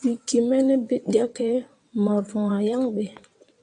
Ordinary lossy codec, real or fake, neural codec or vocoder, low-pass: AAC, 64 kbps; fake; vocoder, 22.05 kHz, 80 mel bands, WaveNeXt; 9.9 kHz